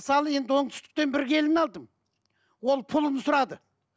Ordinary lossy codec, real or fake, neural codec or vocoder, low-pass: none; real; none; none